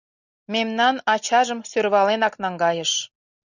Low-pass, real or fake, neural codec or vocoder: 7.2 kHz; real; none